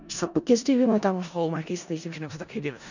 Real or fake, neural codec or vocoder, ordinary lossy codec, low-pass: fake; codec, 16 kHz in and 24 kHz out, 0.4 kbps, LongCat-Audio-Codec, four codebook decoder; none; 7.2 kHz